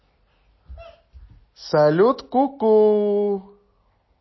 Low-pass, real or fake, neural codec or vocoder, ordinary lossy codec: 7.2 kHz; real; none; MP3, 24 kbps